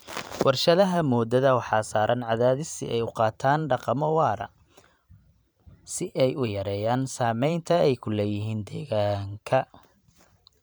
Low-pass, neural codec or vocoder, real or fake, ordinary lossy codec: none; vocoder, 44.1 kHz, 128 mel bands every 512 samples, BigVGAN v2; fake; none